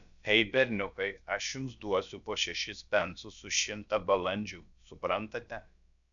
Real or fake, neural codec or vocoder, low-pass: fake; codec, 16 kHz, about 1 kbps, DyCAST, with the encoder's durations; 7.2 kHz